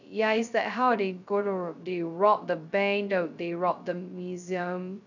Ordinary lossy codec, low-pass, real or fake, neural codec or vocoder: none; 7.2 kHz; fake; codec, 16 kHz, 0.2 kbps, FocalCodec